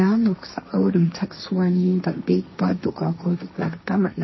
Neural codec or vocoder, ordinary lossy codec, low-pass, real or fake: codec, 16 kHz, 1.1 kbps, Voila-Tokenizer; MP3, 24 kbps; 7.2 kHz; fake